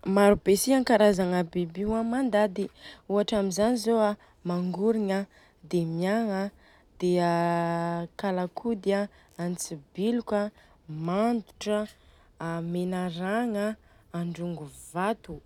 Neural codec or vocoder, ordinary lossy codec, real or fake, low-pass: none; none; real; 19.8 kHz